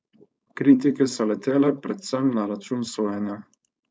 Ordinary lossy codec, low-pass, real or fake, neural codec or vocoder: none; none; fake; codec, 16 kHz, 4.8 kbps, FACodec